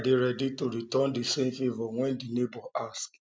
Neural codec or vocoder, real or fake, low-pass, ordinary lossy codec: none; real; none; none